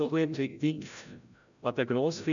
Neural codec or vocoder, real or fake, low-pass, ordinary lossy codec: codec, 16 kHz, 0.5 kbps, FreqCodec, larger model; fake; 7.2 kHz; none